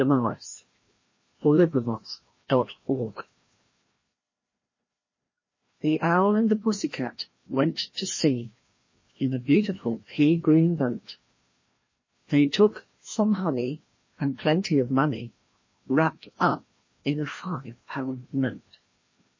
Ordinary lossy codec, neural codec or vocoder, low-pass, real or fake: MP3, 32 kbps; codec, 16 kHz, 1 kbps, FreqCodec, larger model; 7.2 kHz; fake